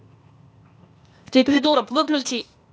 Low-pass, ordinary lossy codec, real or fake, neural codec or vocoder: none; none; fake; codec, 16 kHz, 0.8 kbps, ZipCodec